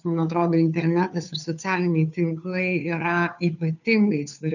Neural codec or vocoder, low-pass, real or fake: codec, 16 kHz, 2 kbps, FunCodec, trained on Chinese and English, 25 frames a second; 7.2 kHz; fake